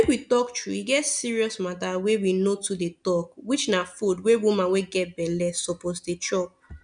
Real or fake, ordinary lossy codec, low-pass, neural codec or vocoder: real; none; 9.9 kHz; none